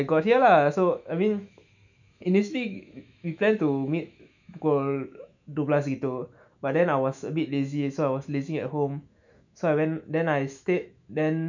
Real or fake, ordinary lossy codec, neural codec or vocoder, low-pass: fake; none; autoencoder, 48 kHz, 128 numbers a frame, DAC-VAE, trained on Japanese speech; 7.2 kHz